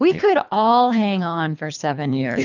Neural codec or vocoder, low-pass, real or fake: codec, 24 kHz, 3 kbps, HILCodec; 7.2 kHz; fake